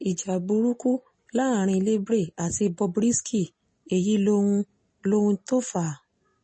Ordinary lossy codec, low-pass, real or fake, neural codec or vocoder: MP3, 32 kbps; 9.9 kHz; real; none